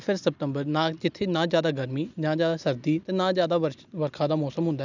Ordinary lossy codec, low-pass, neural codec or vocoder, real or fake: none; 7.2 kHz; none; real